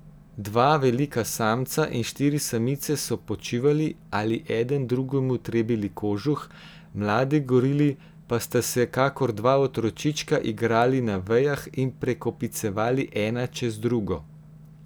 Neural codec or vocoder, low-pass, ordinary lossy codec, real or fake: none; none; none; real